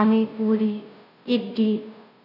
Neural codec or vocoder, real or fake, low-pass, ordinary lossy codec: codec, 16 kHz, 0.5 kbps, FunCodec, trained on Chinese and English, 25 frames a second; fake; 5.4 kHz; none